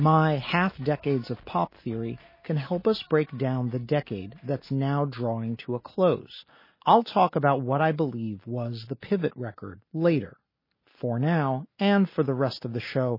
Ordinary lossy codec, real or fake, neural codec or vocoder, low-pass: MP3, 24 kbps; real; none; 5.4 kHz